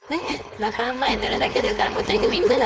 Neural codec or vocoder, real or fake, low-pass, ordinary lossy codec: codec, 16 kHz, 4.8 kbps, FACodec; fake; none; none